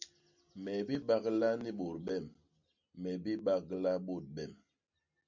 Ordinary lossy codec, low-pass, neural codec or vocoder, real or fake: MP3, 64 kbps; 7.2 kHz; none; real